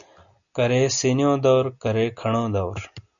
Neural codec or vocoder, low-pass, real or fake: none; 7.2 kHz; real